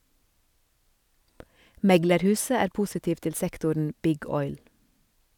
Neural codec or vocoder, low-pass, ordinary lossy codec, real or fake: none; 19.8 kHz; none; real